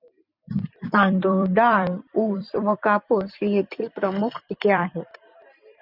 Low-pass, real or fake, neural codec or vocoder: 5.4 kHz; fake; vocoder, 44.1 kHz, 128 mel bands every 256 samples, BigVGAN v2